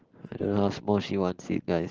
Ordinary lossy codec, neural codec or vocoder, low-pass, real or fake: Opus, 16 kbps; none; 7.2 kHz; real